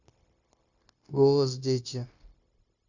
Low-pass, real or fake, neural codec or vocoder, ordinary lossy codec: 7.2 kHz; fake; codec, 16 kHz, 0.9 kbps, LongCat-Audio-Codec; Opus, 64 kbps